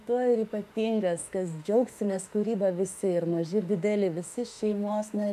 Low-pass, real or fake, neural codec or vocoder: 14.4 kHz; fake; autoencoder, 48 kHz, 32 numbers a frame, DAC-VAE, trained on Japanese speech